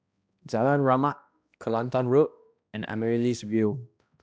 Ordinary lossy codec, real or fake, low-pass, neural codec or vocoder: none; fake; none; codec, 16 kHz, 1 kbps, X-Codec, HuBERT features, trained on balanced general audio